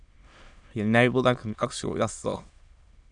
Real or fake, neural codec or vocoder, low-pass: fake; autoencoder, 22.05 kHz, a latent of 192 numbers a frame, VITS, trained on many speakers; 9.9 kHz